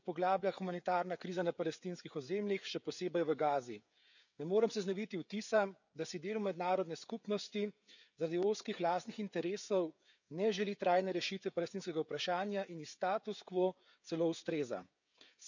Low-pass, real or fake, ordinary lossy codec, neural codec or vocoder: 7.2 kHz; fake; none; codec, 16 kHz, 16 kbps, FreqCodec, smaller model